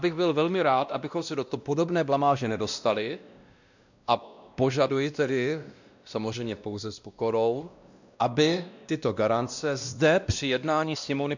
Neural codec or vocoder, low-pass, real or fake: codec, 16 kHz, 1 kbps, X-Codec, WavLM features, trained on Multilingual LibriSpeech; 7.2 kHz; fake